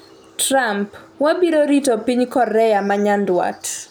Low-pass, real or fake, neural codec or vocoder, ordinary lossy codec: none; real; none; none